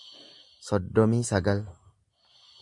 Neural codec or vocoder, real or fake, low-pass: none; real; 10.8 kHz